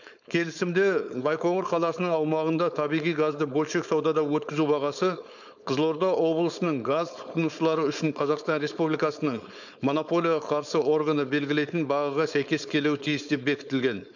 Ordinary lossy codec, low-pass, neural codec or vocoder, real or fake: none; 7.2 kHz; codec, 16 kHz, 4.8 kbps, FACodec; fake